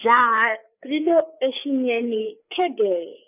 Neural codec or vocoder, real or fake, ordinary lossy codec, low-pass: codec, 16 kHz, 4 kbps, FreqCodec, larger model; fake; none; 3.6 kHz